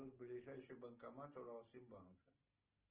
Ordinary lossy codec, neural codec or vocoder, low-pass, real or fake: Opus, 16 kbps; none; 3.6 kHz; real